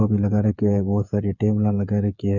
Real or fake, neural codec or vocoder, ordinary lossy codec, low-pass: fake; codec, 16 kHz, 8 kbps, FreqCodec, smaller model; none; 7.2 kHz